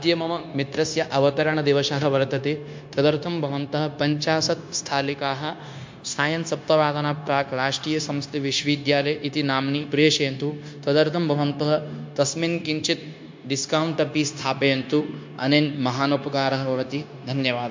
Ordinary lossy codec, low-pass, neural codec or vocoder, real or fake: MP3, 48 kbps; 7.2 kHz; codec, 16 kHz, 0.9 kbps, LongCat-Audio-Codec; fake